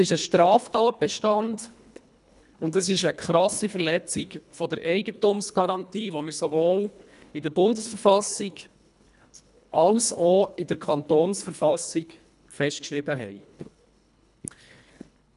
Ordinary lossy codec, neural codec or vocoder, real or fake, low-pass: none; codec, 24 kHz, 1.5 kbps, HILCodec; fake; 10.8 kHz